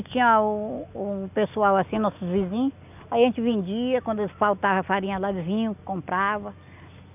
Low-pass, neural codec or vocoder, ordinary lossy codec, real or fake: 3.6 kHz; none; none; real